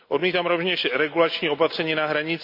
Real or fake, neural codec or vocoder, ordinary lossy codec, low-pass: real; none; MP3, 48 kbps; 5.4 kHz